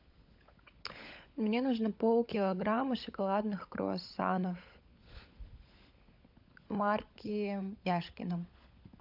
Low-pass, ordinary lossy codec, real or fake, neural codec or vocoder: 5.4 kHz; AAC, 48 kbps; fake; codec, 16 kHz, 16 kbps, FunCodec, trained on LibriTTS, 50 frames a second